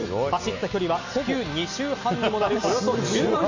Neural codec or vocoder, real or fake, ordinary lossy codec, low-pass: none; real; AAC, 48 kbps; 7.2 kHz